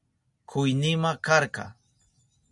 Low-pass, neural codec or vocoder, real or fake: 10.8 kHz; none; real